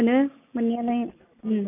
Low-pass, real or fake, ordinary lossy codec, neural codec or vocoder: 3.6 kHz; real; none; none